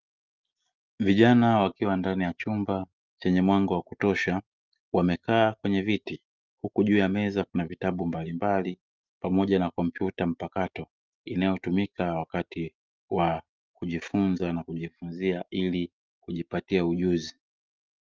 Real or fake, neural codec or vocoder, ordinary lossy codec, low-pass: real; none; Opus, 32 kbps; 7.2 kHz